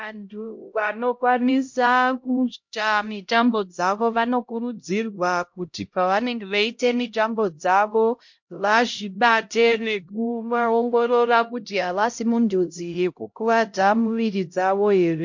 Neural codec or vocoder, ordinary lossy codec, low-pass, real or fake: codec, 16 kHz, 0.5 kbps, X-Codec, HuBERT features, trained on LibriSpeech; MP3, 48 kbps; 7.2 kHz; fake